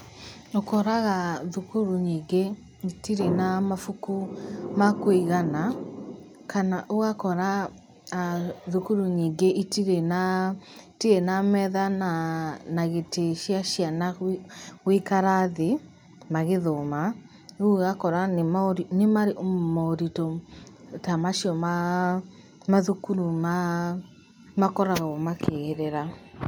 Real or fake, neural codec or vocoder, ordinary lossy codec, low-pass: real; none; none; none